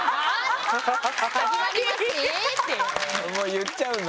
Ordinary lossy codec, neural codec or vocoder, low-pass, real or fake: none; none; none; real